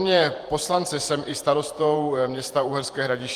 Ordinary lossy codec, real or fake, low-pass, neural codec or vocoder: Opus, 24 kbps; fake; 14.4 kHz; vocoder, 48 kHz, 128 mel bands, Vocos